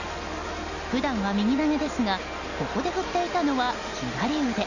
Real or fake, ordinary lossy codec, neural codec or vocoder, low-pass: real; none; none; 7.2 kHz